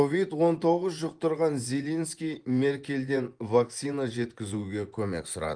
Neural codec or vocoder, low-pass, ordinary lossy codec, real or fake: vocoder, 24 kHz, 100 mel bands, Vocos; 9.9 kHz; Opus, 32 kbps; fake